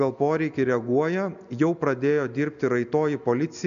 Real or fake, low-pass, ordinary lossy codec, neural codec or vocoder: real; 7.2 kHz; AAC, 96 kbps; none